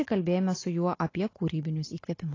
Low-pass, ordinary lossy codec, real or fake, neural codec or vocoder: 7.2 kHz; AAC, 32 kbps; real; none